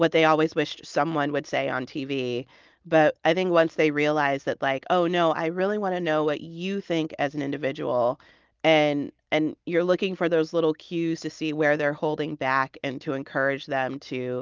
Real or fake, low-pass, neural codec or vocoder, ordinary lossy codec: real; 7.2 kHz; none; Opus, 24 kbps